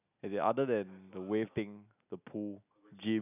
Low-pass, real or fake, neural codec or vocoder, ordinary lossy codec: 3.6 kHz; real; none; none